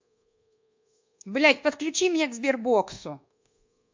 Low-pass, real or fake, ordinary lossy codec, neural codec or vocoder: 7.2 kHz; fake; MP3, 64 kbps; codec, 24 kHz, 1.2 kbps, DualCodec